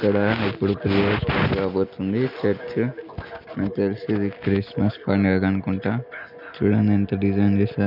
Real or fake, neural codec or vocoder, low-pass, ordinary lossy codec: real; none; 5.4 kHz; none